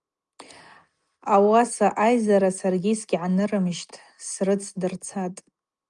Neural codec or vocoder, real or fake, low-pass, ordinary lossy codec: none; real; 10.8 kHz; Opus, 32 kbps